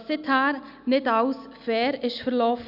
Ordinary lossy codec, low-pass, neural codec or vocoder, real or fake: none; 5.4 kHz; none; real